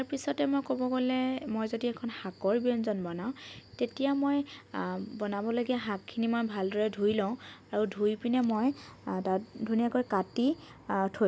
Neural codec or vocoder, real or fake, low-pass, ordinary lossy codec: none; real; none; none